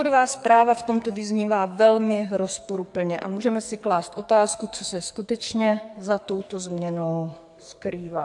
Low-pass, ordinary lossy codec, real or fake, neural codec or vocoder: 10.8 kHz; AAC, 64 kbps; fake; codec, 44.1 kHz, 2.6 kbps, SNAC